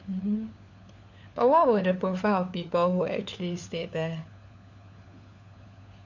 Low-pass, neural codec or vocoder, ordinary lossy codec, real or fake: 7.2 kHz; codec, 16 kHz, 4 kbps, FunCodec, trained on LibriTTS, 50 frames a second; none; fake